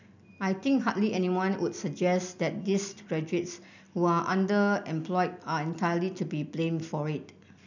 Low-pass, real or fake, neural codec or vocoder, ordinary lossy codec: 7.2 kHz; real; none; none